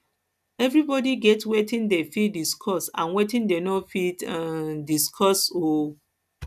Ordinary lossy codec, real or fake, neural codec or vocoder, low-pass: none; real; none; 14.4 kHz